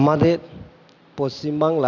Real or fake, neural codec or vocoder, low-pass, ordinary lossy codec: real; none; 7.2 kHz; none